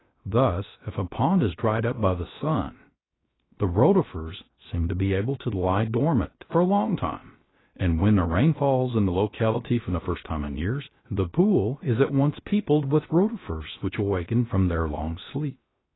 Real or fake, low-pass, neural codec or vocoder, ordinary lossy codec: fake; 7.2 kHz; codec, 16 kHz, 0.3 kbps, FocalCodec; AAC, 16 kbps